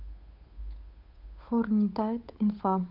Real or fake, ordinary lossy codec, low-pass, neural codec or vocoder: fake; none; 5.4 kHz; codec, 16 kHz, 8 kbps, FunCodec, trained on Chinese and English, 25 frames a second